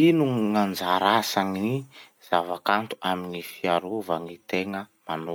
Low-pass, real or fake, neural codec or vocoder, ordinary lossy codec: none; real; none; none